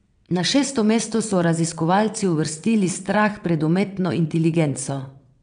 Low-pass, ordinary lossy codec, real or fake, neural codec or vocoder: 9.9 kHz; none; fake; vocoder, 22.05 kHz, 80 mel bands, WaveNeXt